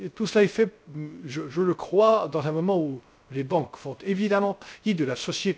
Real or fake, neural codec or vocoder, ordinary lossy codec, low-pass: fake; codec, 16 kHz, 0.3 kbps, FocalCodec; none; none